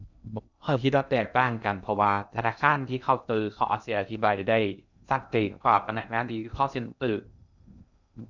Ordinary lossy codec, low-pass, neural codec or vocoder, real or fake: none; 7.2 kHz; codec, 16 kHz in and 24 kHz out, 0.6 kbps, FocalCodec, streaming, 2048 codes; fake